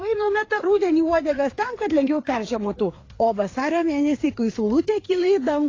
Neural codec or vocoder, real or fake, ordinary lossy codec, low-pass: codec, 16 kHz, 4 kbps, FreqCodec, larger model; fake; AAC, 32 kbps; 7.2 kHz